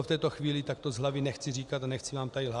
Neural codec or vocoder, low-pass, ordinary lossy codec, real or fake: none; 10.8 kHz; Opus, 64 kbps; real